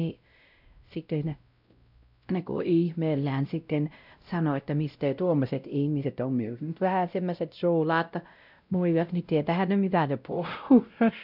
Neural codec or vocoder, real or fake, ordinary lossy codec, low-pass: codec, 16 kHz, 0.5 kbps, X-Codec, WavLM features, trained on Multilingual LibriSpeech; fake; none; 5.4 kHz